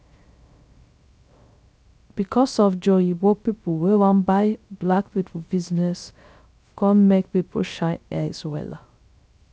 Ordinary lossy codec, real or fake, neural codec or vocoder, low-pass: none; fake; codec, 16 kHz, 0.3 kbps, FocalCodec; none